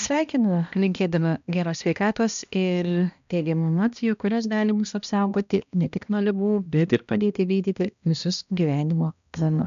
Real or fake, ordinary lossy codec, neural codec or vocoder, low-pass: fake; AAC, 96 kbps; codec, 16 kHz, 1 kbps, X-Codec, HuBERT features, trained on balanced general audio; 7.2 kHz